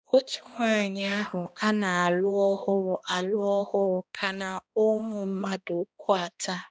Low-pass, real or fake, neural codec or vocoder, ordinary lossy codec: none; fake; codec, 16 kHz, 1 kbps, X-Codec, HuBERT features, trained on balanced general audio; none